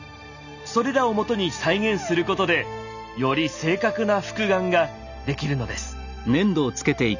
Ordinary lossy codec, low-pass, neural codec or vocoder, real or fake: none; 7.2 kHz; none; real